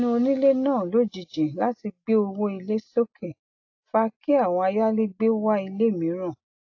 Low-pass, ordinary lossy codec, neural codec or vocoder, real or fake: 7.2 kHz; AAC, 48 kbps; none; real